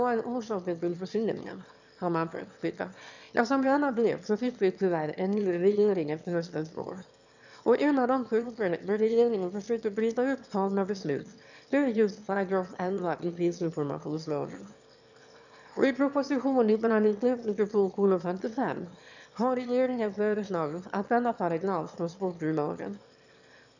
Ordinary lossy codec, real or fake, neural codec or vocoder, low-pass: none; fake; autoencoder, 22.05 kHz, a latent of 192 numbers a frame, VITS, trained on one speaker; 7.2 kHz